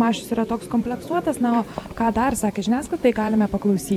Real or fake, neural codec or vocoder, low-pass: fake; vocoder, 44.1 kHz, 128 mel bands every 512 samples, BigVGAN v2; 19.8 kHz